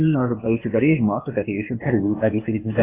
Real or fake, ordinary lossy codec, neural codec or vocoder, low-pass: fake; AAC, 24 kbps; codec, 16 kHz, 0.8 kbps, ZipCodec; 3.6 kHz